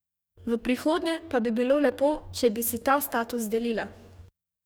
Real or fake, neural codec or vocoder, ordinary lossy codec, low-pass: fake; codec, 44.1 kHz, 2.6 kbps, DAC; none; none